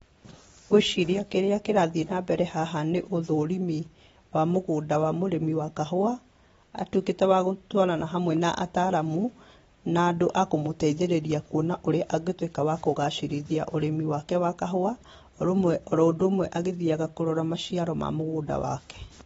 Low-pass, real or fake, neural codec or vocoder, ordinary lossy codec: 19.8 kHz; real; none; AAC, 24 kbps